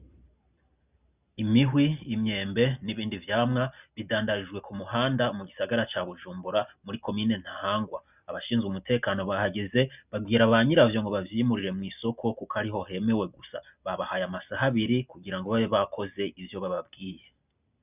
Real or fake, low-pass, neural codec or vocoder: real; 3.6 kHz; none